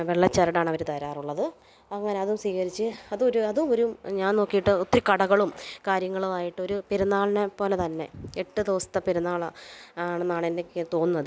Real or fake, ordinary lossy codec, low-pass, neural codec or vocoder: real; none; none; none